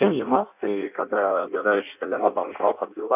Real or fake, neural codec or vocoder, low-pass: fake; codec, 16 kHz in and 24 kHz out, 0.6 kbps, FireRedTTS-2 codec; 3.6 kHz